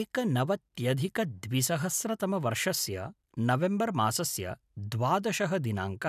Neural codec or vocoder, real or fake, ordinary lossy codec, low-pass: none; real; none; 14.4 kHz